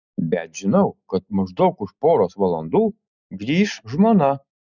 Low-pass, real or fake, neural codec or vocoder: 7.2 kHz; real; none